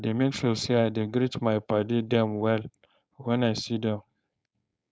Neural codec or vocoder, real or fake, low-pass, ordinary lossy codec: codec, 16 kHz, 4.8 kbps, FACodec; fake; none; none